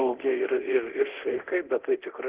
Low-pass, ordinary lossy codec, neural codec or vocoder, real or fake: 3.6 kHz; Opus, 16 kbps; codec, 24 kHz, 0.9 kbps, DualCodec; fake